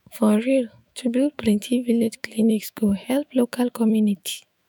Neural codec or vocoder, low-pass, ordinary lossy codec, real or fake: autoencoder, 48 kHz, 128 numbers a frame, DAC-VAE, trained on Japanese speech; none; none; fake